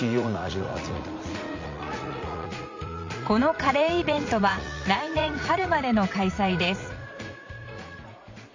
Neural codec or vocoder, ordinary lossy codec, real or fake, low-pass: vocoder, 44.1 kHz, 80 mel bands, Vocos; MP3, 64 kbps; fake; 7.2 kHz